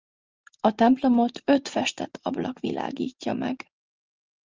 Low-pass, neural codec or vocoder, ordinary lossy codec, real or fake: 7.2 kHz; none; Opus, 24 kbps; real